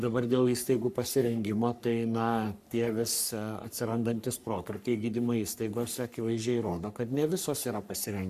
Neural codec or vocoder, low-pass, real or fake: codec, 44.1 kHz, 3.4 kbps, Pupu-Codec; 14.4 kHz; fake